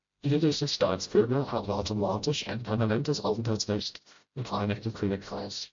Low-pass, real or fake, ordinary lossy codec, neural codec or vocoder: 7.2 kHz; fake; MP3, 48 kbps; codec, 16 kHz, 0.5 kbps, FreqCodec, smaller model